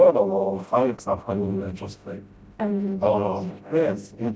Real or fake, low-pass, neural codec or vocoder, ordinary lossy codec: fake; none; codec, 16 kHz, 0.5 kbps, FreqCodec, smaller model; none